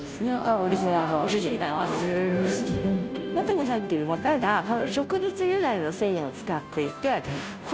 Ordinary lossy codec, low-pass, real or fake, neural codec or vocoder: none; none; fake; codec, 16 kHz, 0.5 kbps, FunCodec, trained on Chinese and English, 25 frames a second